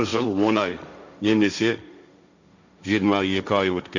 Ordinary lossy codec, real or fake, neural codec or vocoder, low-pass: none; fake; codec, 16 kHz, 1.1 kbps, Voila-Tokenizer; 7.2 kHz